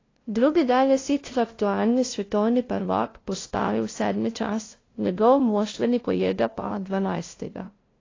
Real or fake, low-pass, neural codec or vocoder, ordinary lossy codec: fake; 7.2 kHz; codec, 16 kHz, 0.5 kbps, FunCodec, trained on LibriTTS, 25 frames a second; AAC, 32 kbps